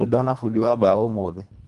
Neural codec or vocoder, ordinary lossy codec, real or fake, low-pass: codec, 24 kHz, 1.5 kbps, HILCodec; Opus, 32 kbps; fake; 10.8 kHz